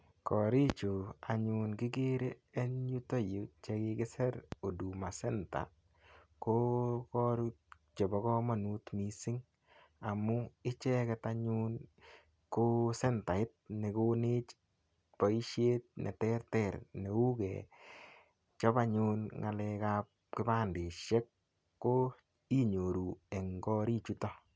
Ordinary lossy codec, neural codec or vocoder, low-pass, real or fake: none; none; none; real